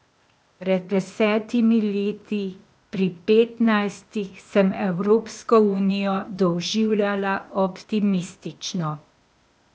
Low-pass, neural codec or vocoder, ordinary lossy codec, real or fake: none; codec, 16 kHz, 0.8 kbps, ZipCodec; none; fake